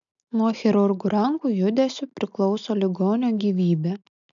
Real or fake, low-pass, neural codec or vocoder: real; 7.2 kHz; none